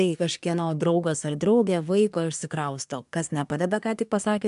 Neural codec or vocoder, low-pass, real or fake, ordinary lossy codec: codec, 24 kHz, 1 kbps, SNAC; 10.8 kHz; fake; AAC, 96 kbps